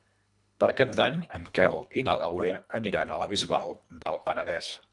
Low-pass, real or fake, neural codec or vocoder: 10.8 kHz; fake; codec, 24 kHz, 1.5 kbps, HILCodec